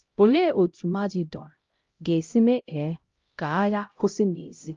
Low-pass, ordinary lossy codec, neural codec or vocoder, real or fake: 7.2 kHz; Opus, 32 kbps; codec, 16 kHz, 0.5 kbps, X-Codec, HuBERT features, trained on LibriSpeech; fake